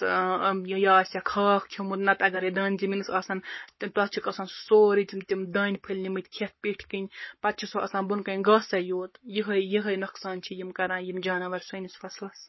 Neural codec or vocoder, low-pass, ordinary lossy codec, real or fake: codec, 44.1 kHz, 7.8 kbps, Pupu-Codec; 7.2 kHz; MP3, 24 kbps; fake